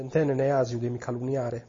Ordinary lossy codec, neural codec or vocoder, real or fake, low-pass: MP3, 32 kbps; none; real; 7.2 kHz